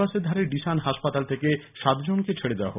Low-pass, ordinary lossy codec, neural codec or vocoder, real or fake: 3.6 kHz; none; none; real